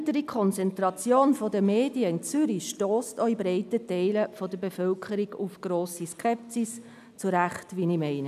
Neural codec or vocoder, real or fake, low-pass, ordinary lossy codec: none; real; 14.4 kHz; none